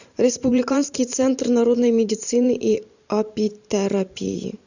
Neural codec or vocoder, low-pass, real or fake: vocoder, 44.1 kHz, 128 mel bands every 512 samples, BigVGAN v2; 7.2 kHz; fake